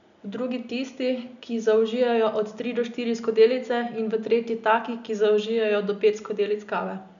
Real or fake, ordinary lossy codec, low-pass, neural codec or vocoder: real; MP3, 96 kbps; 7.2 kHz; none